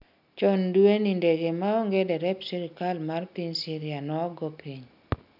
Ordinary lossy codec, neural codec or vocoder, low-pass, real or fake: none; none; 5.4 kHz; real